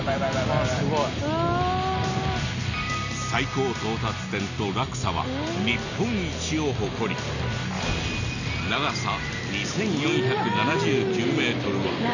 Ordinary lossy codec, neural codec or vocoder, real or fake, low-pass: none; none; real; 7.2 kHz